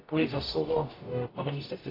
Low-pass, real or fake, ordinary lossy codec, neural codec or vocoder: 5.4 kHz; fake; AAC, 24 kbps; codec, 44.1 kHz, 0.9 kbps, DAC